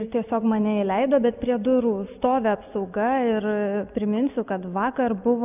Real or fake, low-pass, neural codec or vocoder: real; 3.6 kHz; none